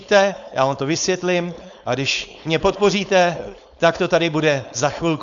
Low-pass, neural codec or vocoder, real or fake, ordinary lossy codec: 7.2 kHz; codec, 16 kHz, 4.8 kbps, FACodec; fake; MP3, 96 kbps